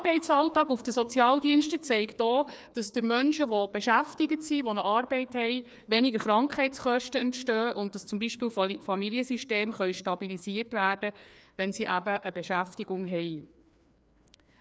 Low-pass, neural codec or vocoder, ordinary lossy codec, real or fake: none; codec, 16 kHz, 2 kbps, FreqCodec, larger model; none; fake